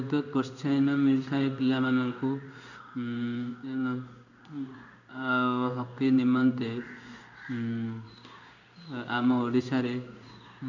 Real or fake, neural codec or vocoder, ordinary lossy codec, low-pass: fake; codec, 16 kHz in and 24 kHz out, 1 kbps, XY-Tokenizer; none; 7.2 kHz